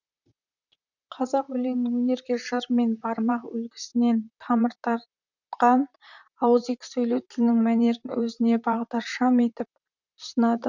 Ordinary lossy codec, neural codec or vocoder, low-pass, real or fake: none; vocoder, 44.1 kHz, 128 mel bands, Pupu-Vocoder; 7.2 kHz; fake